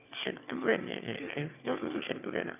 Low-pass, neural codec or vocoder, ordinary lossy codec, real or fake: 3.6 kHz; autoencoder, 22.05 kHz, a latent of 192 numbers a frame, VITS, trained on one speaker; none; fake